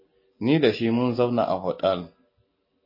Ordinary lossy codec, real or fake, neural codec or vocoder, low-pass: MP3, 24 kbps; real; none; 5.4 kHz